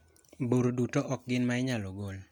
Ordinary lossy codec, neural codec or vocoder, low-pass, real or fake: MP3, 96 kbps; none; 19.8 kHz; real